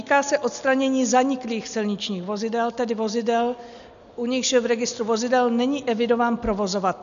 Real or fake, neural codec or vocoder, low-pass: real; none; 7.2 kHz